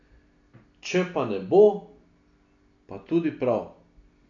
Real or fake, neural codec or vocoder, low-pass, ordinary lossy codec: real; none; 7.2 kHz; none